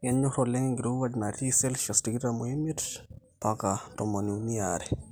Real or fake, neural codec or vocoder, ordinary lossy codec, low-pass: real; none; none; none